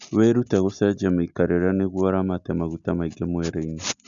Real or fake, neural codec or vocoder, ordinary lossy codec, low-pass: real; none; none; 7.2 kHz